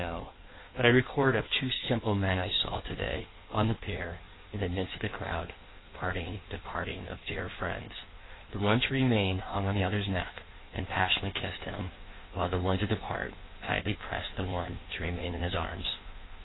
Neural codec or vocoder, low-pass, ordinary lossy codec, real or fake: codec, 16 kHz in and 24 kHz out, 1.1 kbps, FireRedTTS-2 codec; 7.2 kHz; AAC, 16 kbps; fake